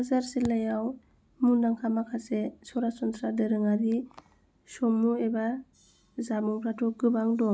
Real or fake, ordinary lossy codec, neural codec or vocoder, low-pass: real; none; none; none